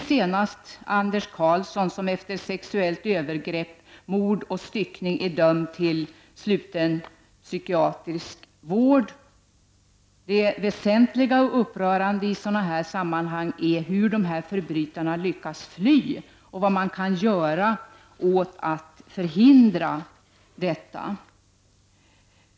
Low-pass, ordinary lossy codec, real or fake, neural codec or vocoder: none; none; real; none